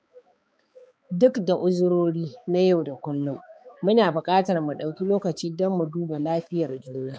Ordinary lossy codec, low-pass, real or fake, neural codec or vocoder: none; none; fake; codec, 16 kHz, 4 kbps, X-Codec, HuBERT features, trained on balanced general audio